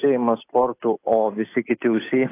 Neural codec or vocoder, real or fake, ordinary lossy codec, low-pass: none; real; AAC, 24 kbps; 3.6 kHz